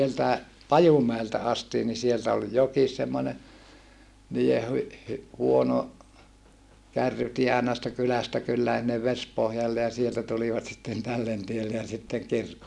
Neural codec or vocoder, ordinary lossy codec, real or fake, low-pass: none; none; real; none